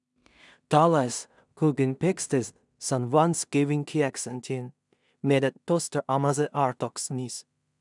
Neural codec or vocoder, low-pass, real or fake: codec, 16 kHz in and 24 kHz out, 0.4 kbps, LongCat-Audio-Codec, two codebook decoder; 10.8 kHz; fake